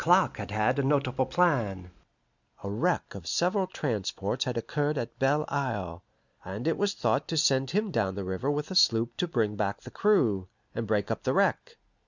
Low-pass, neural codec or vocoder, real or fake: 7.2 kHz; none; real